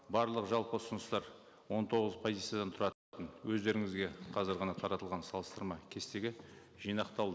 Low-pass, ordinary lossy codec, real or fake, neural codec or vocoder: none; none; real; none